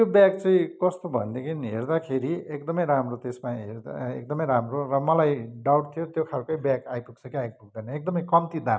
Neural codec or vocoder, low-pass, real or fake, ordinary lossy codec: none; none; real; none